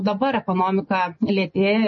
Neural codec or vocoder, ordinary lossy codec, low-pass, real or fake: none; MP3, 32 kbps; 7.2 kHz; real